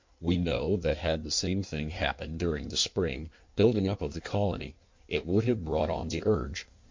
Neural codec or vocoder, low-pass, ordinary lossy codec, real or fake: codec, 16 kHz in and 24 kHz out, 1.1 kbps, FireRedTTS-2 codec; 7.2 kHz; MP3, 48 kbps; fake